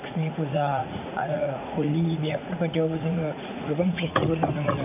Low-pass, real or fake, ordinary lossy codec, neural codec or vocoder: 3.6 kHz; fake; none; vocoder, 44.1 kHz, 80 mel bands, Vocos